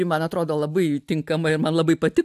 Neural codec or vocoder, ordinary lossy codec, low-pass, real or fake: none; AAC, 96 kbps; 14.4 kHz; real